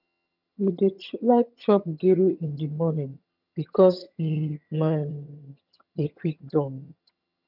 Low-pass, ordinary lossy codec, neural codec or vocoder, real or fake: 5.4 kHz; none; vocoder, 22.05 kHz, 80 mel bands, HiFi-GAN; fake